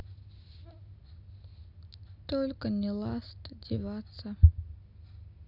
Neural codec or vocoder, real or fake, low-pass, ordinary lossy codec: none; real; 5.4 kHz; none